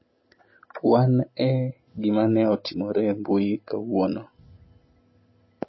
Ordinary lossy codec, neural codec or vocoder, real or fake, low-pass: MP3, 24 kbps; none; real; 7.2 kHz